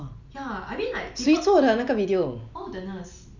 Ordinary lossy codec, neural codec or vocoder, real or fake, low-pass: none; none; real; 7.2 kHz